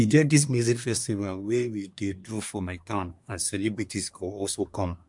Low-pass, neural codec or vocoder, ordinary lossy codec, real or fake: 10.8 kHz; codec, 24 kHz, 1 kbps, SNAC; MP3, 64 kbps; fake